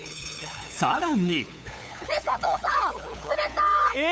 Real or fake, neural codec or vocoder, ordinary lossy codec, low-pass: fake; codec, 16 kHz, 8 kbps, FunCodec, trained on LibriTTS, 25 frames a second; none; none